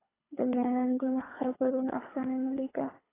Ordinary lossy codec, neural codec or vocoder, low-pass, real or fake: AAC, 16 kbps; codec, 24 kHz, 3 kbps, HILCodec; 3.6 kHz; fake